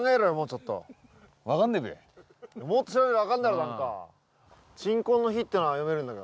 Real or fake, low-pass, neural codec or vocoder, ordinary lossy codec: real; none; none; none